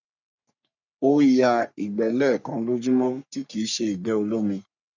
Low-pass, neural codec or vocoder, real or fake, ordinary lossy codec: 7.2 kHz; codec, 44.1 kHz, 3.4 kbps, Pupu-Codec; fake; none